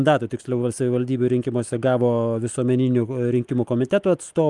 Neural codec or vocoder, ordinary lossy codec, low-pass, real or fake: none; Opus, 32 kbps; 10.8 kHz; real